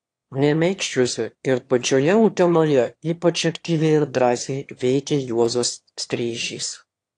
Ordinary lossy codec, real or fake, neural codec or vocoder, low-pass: AAC, 48 kbps; fake; autoencoder, 22.05 kHz, a latent of 192 numbers a frame, VITS, trained on one speaker; 9.9 kHz